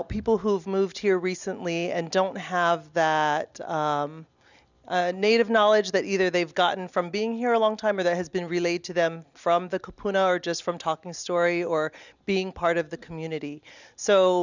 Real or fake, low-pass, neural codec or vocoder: real; 7.2 kHz; none